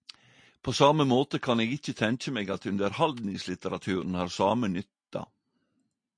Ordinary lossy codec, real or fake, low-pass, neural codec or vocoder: MP3, 48 kbps; real; 9.9 kHz; none